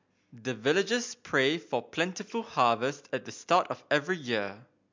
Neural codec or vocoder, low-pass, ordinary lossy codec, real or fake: none; 7.2 kHz; MP3, 64 kbps; real